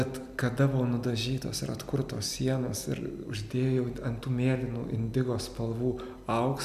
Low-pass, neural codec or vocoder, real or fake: 14.4 kHz; none; real